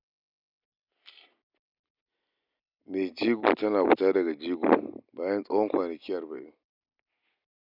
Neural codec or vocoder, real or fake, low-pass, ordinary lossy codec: none; real; 5.4 kHz; none